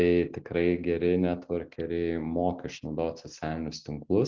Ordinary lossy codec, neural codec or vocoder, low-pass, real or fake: Opus, 32 kbps; none; 7.2 kHz; real